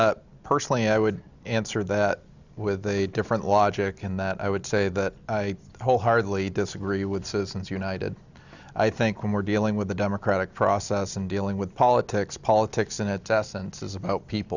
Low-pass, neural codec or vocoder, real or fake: 7.2 kHz; none; real